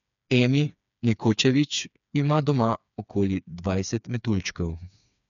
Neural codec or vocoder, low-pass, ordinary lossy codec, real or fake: codec, 16 kHz, 4 kbps, FreqCodec, smaller model; 7.2 kHz; none; fake